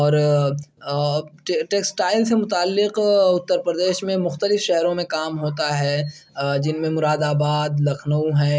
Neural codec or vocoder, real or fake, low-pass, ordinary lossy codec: none; real; none; none